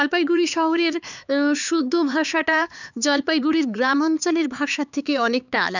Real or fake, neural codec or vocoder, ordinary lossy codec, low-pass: fake; codec, 16 kHz, 4 kbps, X-Codec, HuBERT features, trained on LibriSpeech; none; 7.2 kHz